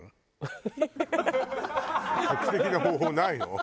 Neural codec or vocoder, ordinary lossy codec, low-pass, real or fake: none; none; none; real